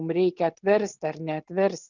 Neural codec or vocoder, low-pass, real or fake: none; 7.2 kHz; real